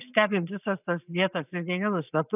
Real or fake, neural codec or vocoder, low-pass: real; none; 3.6 kHz